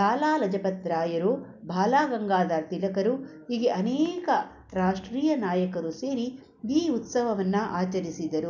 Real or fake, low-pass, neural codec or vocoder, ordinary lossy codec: real; 7.2 kHz; none; none